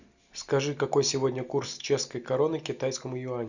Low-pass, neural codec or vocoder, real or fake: 7.2 kHz; none; real